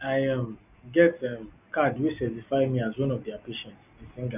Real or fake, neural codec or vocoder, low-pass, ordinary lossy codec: real; none; 3.6 kHz; none